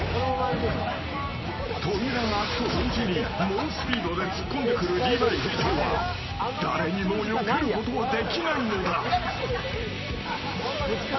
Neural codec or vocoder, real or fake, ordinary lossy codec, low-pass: none; real; MP3, 24 kbps; 7.2 kHz